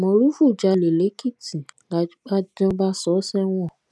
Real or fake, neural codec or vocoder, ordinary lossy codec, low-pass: real; none; none; none